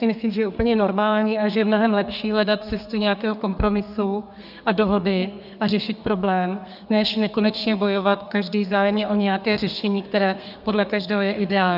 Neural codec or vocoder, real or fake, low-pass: codec, 32 kHz, 1.9 kbps, SNAC; fake; 5.4 kHz